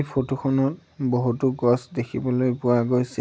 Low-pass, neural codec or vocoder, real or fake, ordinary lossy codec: none; none; real; none